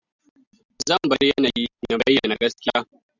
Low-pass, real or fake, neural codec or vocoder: 7.2 kHz; real; none